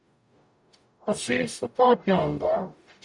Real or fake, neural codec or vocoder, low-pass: fake; codec, 44.1 kHz, 0.9 kbps, DAC; 10.8 kHz